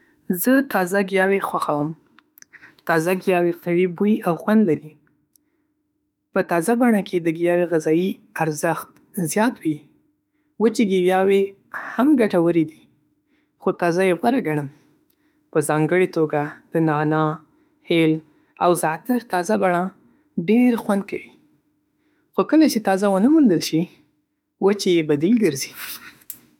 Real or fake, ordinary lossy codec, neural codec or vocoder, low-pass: fake; none; autoencoder, 48 kHz, 32 numbers a frame, DAC-VAE, trained on Japanese speech; 19.8 kHz